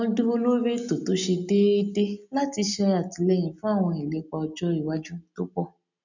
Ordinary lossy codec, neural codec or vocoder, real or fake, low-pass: none; none; real; 7.2 kHz